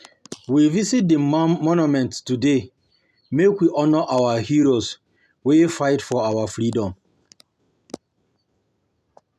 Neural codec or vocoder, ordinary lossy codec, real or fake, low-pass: none; none; real; 14.4 kHz